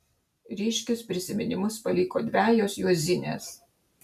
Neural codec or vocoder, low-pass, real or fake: none; 14.4 kHz; real